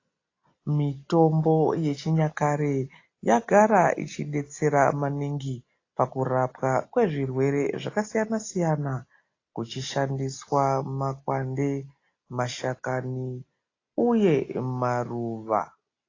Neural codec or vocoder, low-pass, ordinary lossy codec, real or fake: none; 7.2 kHz; AAC, 32 kbps; real